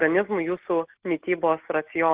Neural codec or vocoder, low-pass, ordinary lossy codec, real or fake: none; 3.6 kHz; Opus, 16 kbps; real